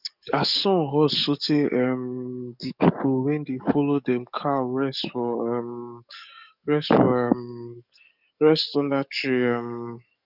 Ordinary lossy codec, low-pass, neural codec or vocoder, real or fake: none; 5.4 kHz; codec, 44.1 kHz, 7.8 kbps, DAC; fake